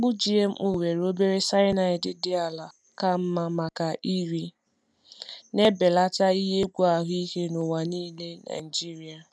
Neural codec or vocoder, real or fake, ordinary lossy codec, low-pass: none; real; none; none